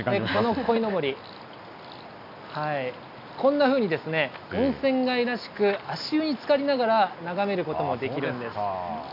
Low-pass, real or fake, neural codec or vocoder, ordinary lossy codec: 5.4 kHz; real; none; none